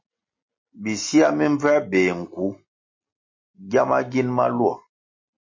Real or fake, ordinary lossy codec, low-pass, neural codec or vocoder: real; MP3, 32 kbps; 7.2 kHz; none